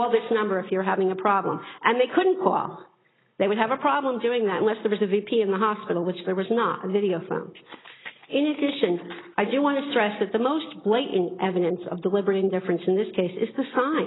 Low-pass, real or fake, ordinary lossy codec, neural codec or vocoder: 7.2 kHz; real; AAC, 16 kbps; none